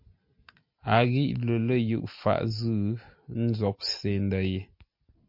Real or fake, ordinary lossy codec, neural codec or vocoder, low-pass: real; MP3, 48 kbps; none; 5.4 kHz